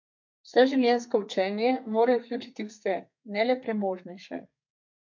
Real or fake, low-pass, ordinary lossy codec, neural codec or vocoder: fake; 7.2 kHz; MP3, 48 kbps; codec, 32 kHz, 1.9 kbps, SNAC